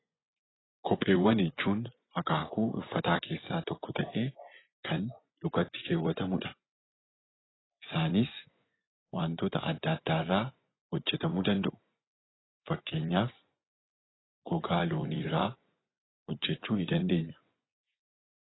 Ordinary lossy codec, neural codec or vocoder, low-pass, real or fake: AAC, 16 kbps; vocoder, 24 kHz, 100 mel bands, Vocos; 7.2 kHz; fake